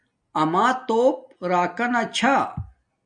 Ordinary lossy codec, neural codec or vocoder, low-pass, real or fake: MP3, 96 kbps; none; 9.9 kHz; real